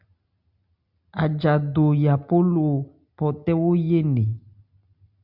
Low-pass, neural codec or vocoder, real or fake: 5.4 kHz; none; real